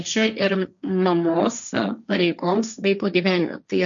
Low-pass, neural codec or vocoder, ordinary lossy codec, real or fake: 7.2 kHz; codec, 16 kHz, 1.1 kbps, Voila-Tokenizer; MP3, 96 kbps; fake